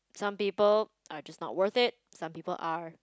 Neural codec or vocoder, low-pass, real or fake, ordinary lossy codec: none; none; real; none